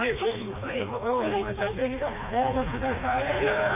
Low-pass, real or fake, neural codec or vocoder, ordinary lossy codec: 3.6 kHz; fake; codec, 16 kHz, 2 kbps, FreqCodec, smaller model; none